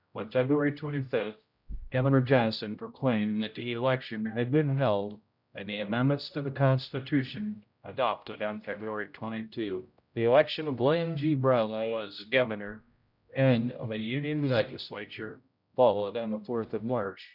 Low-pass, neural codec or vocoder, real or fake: 5.4 kHz; codec, 16 kHz, 0.5 kbps, X-Codec, HuBERT features, trained on general audio; fake